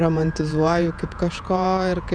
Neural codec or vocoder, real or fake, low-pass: vocoder, 48 kHz, 128 mel bands, Vocos; fake; 9.9 kHz